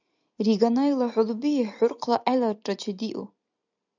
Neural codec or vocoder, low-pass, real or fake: none; 7.2 kHz; real